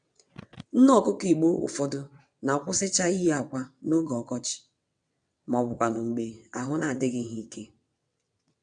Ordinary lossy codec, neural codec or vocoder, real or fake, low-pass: none; vocoder, 22.05 kHz, 80 mel bands, WaveNeXt; fake; 9.9 kHz